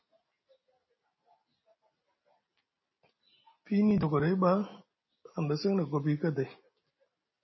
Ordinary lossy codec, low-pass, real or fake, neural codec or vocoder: MP3, 24 kbps; 7.2 kHz; real; none